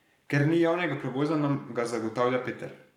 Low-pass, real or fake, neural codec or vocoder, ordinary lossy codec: 19.8 kHz; fake; codec, 44.1 kHz, 7.8 kbps, Pupu-Codec; none